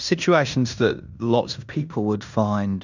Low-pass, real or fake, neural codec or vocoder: 7.2 kHz; fake; codec, 16 kHz in and 24 kHz out, 0.9 kbps, LongCat-Audio-Codec, fine tuned four codebook decoder